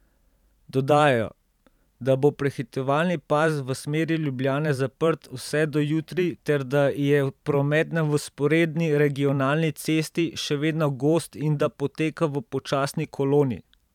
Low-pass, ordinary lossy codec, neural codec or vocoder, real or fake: 19.8 kHz; none; vocoder, 44.1 kHz, 128 mel bands every 512 samples, BigVGAN v2; fake